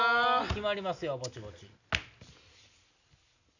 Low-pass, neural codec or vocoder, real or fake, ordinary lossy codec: 7.2 kHz; none; real; none